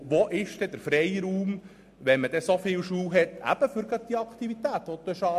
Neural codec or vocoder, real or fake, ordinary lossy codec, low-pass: none; real; MP3, 64 kbps; 14.4 kHz